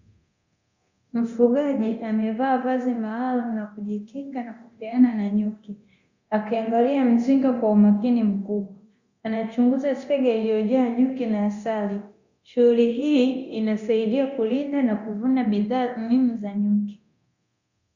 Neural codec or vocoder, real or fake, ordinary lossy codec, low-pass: codec, 24 kHz, 0.9 kbps, DualCodec; fake; Opus, 64 kbps; 7.2 kHz